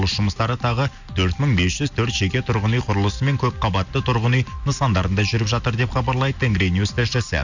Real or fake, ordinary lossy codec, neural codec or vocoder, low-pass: real; none; none; 7.2 kHz